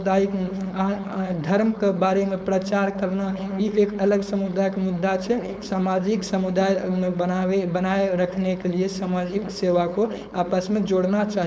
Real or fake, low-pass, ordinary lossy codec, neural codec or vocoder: fake; none; none; codec, 16 kHz, 4.8 kbps, FACodec